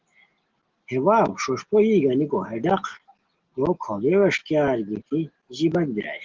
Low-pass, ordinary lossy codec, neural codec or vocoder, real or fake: 7.2 kHz; Opus, 16 kbps; none; real